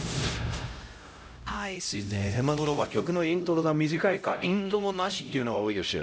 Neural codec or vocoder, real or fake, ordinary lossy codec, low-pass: codec, 16 kHz, 0.5 kbps, X-Codec, HuBERT features, trained on LibriSpeech; fake; none; none